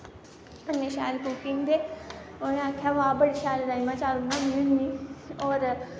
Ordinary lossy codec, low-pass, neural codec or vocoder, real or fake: none; none; none; real